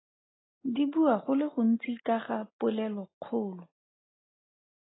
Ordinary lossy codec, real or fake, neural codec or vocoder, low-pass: AAC, 16 kbps; real; none; 7.2 kHz